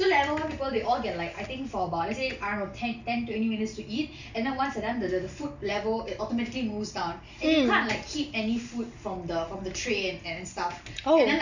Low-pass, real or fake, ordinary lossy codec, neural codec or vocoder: 7.2 kHz; real; none; none